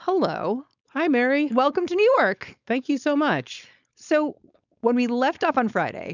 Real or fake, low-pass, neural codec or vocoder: fake; 7.2 kHz; codec, 16 kHz, 4.8 kbps, FACodec